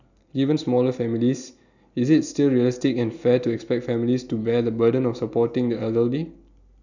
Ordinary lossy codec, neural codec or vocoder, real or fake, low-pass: none; none; real; 7.2 kHz